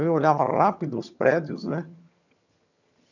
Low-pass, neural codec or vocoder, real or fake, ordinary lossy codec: 7.2 kHz; vocoder, 22.05 kHz, 80 mel bands, HiFi-GAN; fake; none